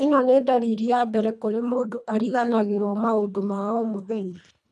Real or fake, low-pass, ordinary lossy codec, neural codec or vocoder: fake; none; none; codec, 24 kHz, 1.5 kbps, HILCodec